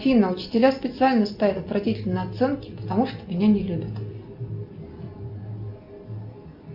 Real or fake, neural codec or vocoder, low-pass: real; none; 5.4 kHz